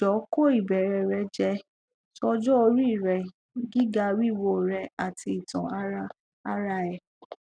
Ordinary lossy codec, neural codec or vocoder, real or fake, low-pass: none; none; real; 9.9 kHz